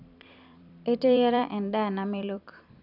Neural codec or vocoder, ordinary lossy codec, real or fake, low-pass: vocoder, 44.1 kHz, 128 mel bands every 256 samples, BigVGAN v2; none; fake; 5.4 kHz